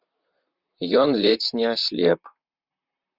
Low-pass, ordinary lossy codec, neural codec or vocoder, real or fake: 5.4 kHz; Opus, 64 kbps; vocoder, 44.1 kHz, 128 mel bands, Pupu-Vocoder; fake